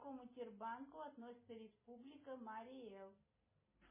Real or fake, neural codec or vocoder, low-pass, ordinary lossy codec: real; none; 3.6 kHz; MP3, 16 kbps